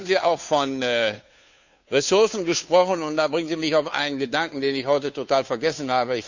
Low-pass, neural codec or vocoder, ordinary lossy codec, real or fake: 7.2 kHz; codec, 16 kHz, 2 kbps, FunCodec, trained on Chinese and English, 25 frames a second; none; fake